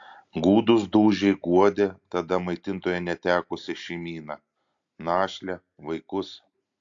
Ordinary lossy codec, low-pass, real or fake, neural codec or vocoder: AAC, 64 kbps; 7.2 kHz; real; none